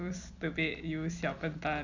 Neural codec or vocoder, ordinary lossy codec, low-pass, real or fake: none; none; 7.2 kHz; real